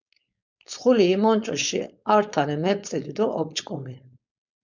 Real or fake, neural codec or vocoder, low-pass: fake; codec, 16 kHz, 4.8 kbps, FACodec; 7.2 kHz